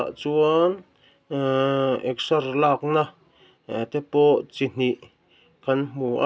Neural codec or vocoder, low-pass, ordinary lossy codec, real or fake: none; none; none; real